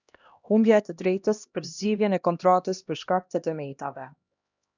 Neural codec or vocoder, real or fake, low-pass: codec, 16 kHz, 1 kbps, X-Codec, HuBERT features, trained on LibriSpeech; fake; 7.2 kHz